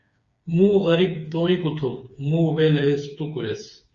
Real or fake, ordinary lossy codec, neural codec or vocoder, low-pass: fake; Opus, 64 kbps; codec, 16 kHz, 4 kbps, FreqCodec, smaller model; 7.2 kHz